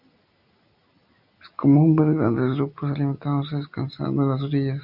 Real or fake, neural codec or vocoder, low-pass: real; none; 5.4 kHz